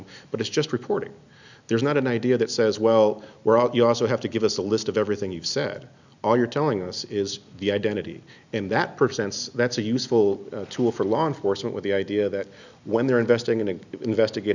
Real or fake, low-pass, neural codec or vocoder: real; 7.2 kHz; none